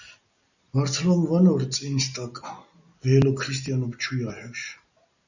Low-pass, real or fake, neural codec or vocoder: 7.2 kHz; real; none